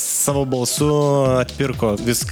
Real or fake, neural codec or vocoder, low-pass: real; none; 19.8 kHz